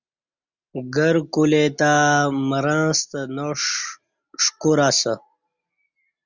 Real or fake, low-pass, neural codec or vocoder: real; 7.2 kHz; none